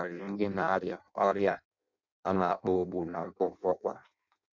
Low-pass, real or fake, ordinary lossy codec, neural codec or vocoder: 7.2 kHz; fake; none; codec, 16 kHz in and 24 kHz out, 0.6 kbps, FireRedTTS-2 codec